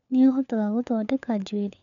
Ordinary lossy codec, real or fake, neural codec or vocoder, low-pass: none; fake; codec, 16 kHz, 4 kbps, FunCodec, trained on LibriTTS, 50 frames a second; 7.2 kHz